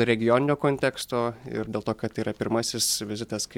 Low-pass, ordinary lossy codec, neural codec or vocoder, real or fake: 19.8 kHz; MP3, 96 kbps; none; real